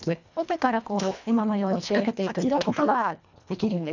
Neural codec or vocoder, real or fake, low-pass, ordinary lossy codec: codec, 24 kHz, 1.5 kbps, HILCodec; fake; 7.2 kHz; none